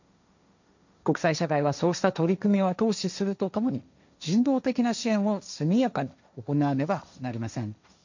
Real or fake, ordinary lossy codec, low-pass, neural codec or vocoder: fake; none; 7.2 kHz; codec, 16 kHz, 1.1 kbps, Voila-Tokenizer